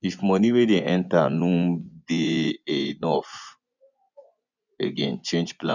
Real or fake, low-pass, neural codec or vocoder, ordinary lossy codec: fake; 7.2 kHz; vocoder, 24 kHz, 100 mel bands, Vocos; none